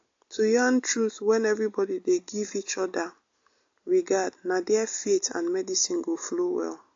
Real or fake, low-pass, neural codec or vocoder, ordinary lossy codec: real; 7.2 kHz; none; AAC, 48 kbps